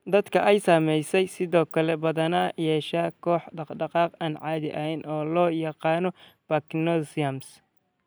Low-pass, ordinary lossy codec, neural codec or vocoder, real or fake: none; none; none; real